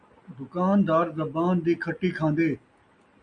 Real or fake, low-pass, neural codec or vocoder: real; 9.9 kHz; none